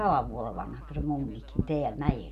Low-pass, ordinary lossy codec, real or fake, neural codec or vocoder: 14.4 kHz; none; real; none